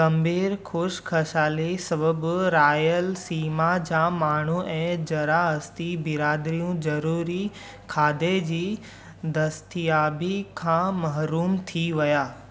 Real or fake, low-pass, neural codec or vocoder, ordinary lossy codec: real; none; none; none